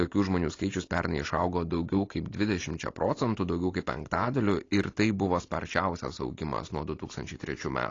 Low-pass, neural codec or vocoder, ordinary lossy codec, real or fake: 7.2 kHz; none; AAC, 32 kbps; real